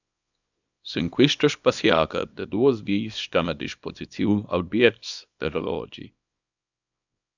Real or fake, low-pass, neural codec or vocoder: fake; 7.2 kHz; codec, 24 kHz, 0.9 kbps, WavTokenizer, small release